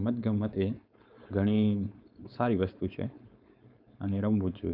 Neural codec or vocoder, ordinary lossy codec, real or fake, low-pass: codec, 16 kHz, 4.8 kbps, FACodec; none; fake; 5.4 kHz